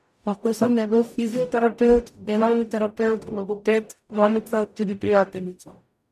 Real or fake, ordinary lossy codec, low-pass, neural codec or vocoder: fake; MP3, 96 kbps; 14.4 kHz; codec, 44.1 kHz, 0.9 kbps, DAC